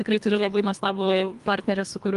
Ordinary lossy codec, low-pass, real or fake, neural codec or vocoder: Opus, 32 kbps; 10.8 kHz; fake; codec, 24 kHz, 1.5 kbps, HILCodec